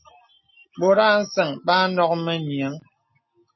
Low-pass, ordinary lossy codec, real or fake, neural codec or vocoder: 7.2 kHz; MP3, 24 kbps; real; none